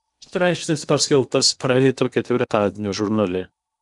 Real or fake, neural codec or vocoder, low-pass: fake; codec, 16 kHz in and 24 kHz out, 0.8 kbps, FocalCodec, streaming, 65536 codes; 10.8 kHz